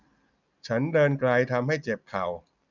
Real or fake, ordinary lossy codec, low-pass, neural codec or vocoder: real; none; 7.2 kHz; none